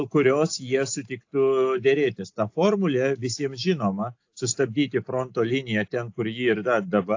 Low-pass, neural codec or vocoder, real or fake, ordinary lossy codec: 7.2 kHz; none; real; AAC, 48 kbps